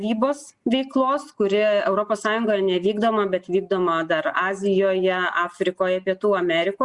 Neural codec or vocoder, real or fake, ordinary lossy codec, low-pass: none; real; Opus, 64 kbps; 10.8 kHz